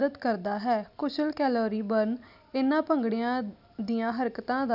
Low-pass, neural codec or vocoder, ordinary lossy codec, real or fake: 5.4 kHz; none; none; real